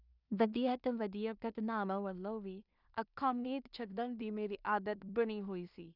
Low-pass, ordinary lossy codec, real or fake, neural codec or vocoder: 5.4 kHz; none; fake; codec, 16 kHz in and 24 kHz out, 0.4 kbps, LongCat-Audio-Codec, two codebook decoder